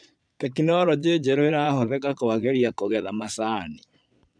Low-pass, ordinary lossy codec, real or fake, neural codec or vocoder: 9.9 kHz; none; fake; vocoder, 22.05 kHz, 80 mel bands, Vocos